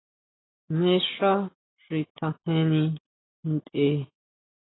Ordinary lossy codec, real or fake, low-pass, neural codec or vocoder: AAC, 16 kbps; real; 7.2 kHz; none